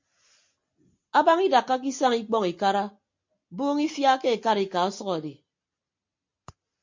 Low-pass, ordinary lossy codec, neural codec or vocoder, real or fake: 7.2 kHz; MP3, 48 kbps; none; real